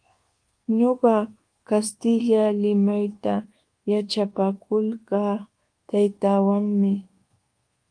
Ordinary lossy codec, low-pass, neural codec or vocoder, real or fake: Opus, 24 kbps; 9.9 kHz; codec, 24 kHz, 1.2 kbps, DualCodec; fake